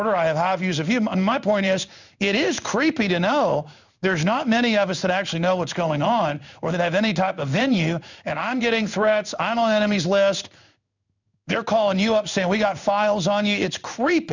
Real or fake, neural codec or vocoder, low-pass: fake; codec, 16 kHz in and 24 kHz out, 1 kbps, XY-Tokenizer; 7.2 kHz